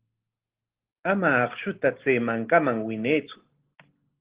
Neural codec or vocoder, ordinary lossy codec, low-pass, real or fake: none; Opus, 16 kbps; 3.6 kHz; real